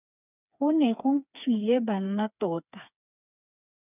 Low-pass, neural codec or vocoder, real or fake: 3.6 kHz; codec, 16 kHz, 4 kbps, FreqCodec, smaller model; fake